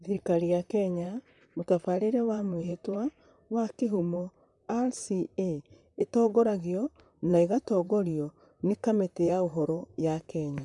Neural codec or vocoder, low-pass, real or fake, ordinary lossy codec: vocoder, 44.1 kHz, 128 mel bands, Pupu-Vocoder; 10.8 kHz; fake; none